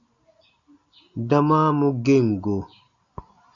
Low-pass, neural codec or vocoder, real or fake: 7.2 kHz; none; real